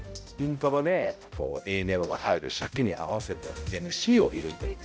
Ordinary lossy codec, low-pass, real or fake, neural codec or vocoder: none; none; fake; codec, 16 kHz, 0.5 kbps, X-Codec, HuBERT features, trained on balanced general audio